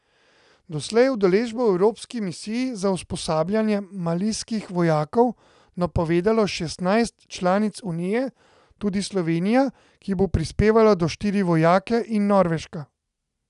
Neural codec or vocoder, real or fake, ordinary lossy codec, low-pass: none; real; none; 10.8 kHz